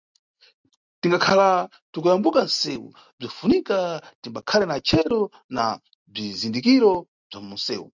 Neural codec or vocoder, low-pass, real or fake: none; 7.2 kHz; real